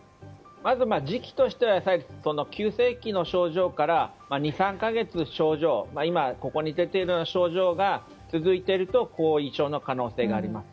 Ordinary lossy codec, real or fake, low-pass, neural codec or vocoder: none; real; none; none